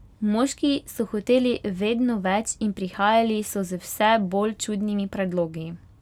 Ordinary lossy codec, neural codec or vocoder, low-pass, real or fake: none; none; 19.8 kHz; real